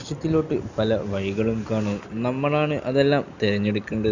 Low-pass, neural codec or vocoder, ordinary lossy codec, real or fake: 7.2 kHz; none; none; real